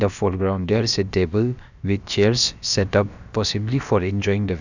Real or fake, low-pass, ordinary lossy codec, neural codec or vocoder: fake; 7.2 kHz; none; codec, 16 kHz, about 1 kbps, DyCAST, with the encoder's durations